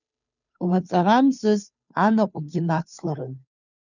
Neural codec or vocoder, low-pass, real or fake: codec, 16 kHz, 2 kbps, FunCodec, trained on Chinese and English, 25 frames a second; 7.2 kHz; fake